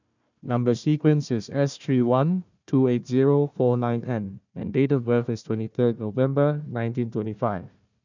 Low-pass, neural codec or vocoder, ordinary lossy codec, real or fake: 7.2 kHz; codec, 16 kHz, 1 kbps, FunCodec, trained on Chinese and English, 50 frames a second; none; fake